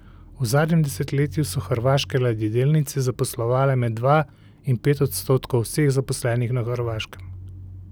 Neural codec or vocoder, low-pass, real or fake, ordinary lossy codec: none; none; real; none